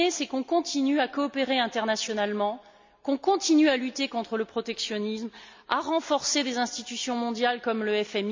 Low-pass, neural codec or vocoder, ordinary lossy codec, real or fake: 7.2 kHz; none; MP3, 64 kbps; real